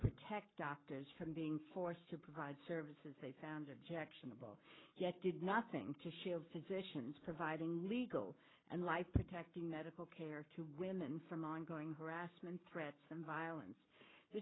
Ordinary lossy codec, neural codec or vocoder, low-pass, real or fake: AAC, 16 kbps; codec, 44.1 kHz, 7.8 kbps, Pupu-Codec; 7.2 kHz; fake